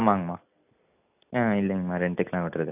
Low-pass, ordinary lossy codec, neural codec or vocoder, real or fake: 3.6 kHz; none; none; real